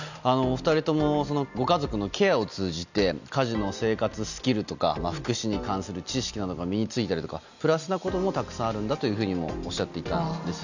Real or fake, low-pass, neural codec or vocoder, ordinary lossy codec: real; 7.2 kHz; none; none